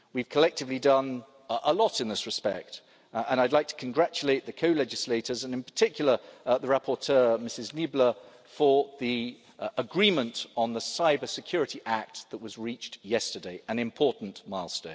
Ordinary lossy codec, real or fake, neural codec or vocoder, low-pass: none; real; none; none